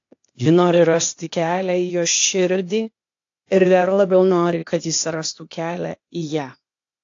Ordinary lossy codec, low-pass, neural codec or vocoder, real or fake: AAC, 48 kbps; 7.2 kHz; codec, 16 kHz, 0.8 kbps, ZipCodec; fake